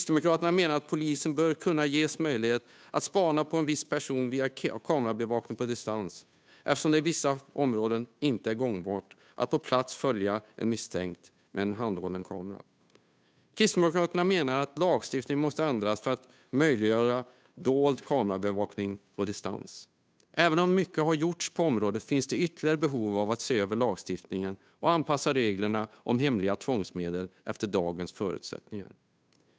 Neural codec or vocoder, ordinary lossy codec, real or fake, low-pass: codec, 16 kHz, 2 kbps, FunCodec, trained on Chinese and English, 25 frames a second; none; fake; none